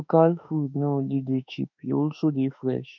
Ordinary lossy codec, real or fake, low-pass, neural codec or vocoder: none; fake; 7.2 kHz; autoencoder, 48 kHz, 32 numbers a frame, DAC-VAE, trained on Japanese speech